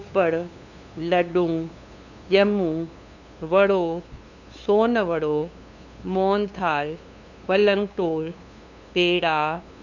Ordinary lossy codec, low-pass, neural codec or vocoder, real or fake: none; 7.2 kHz; codec, 16 kHz, 2 kbps, FunCodec, trained on LibriTTS, 25 frames a second; fake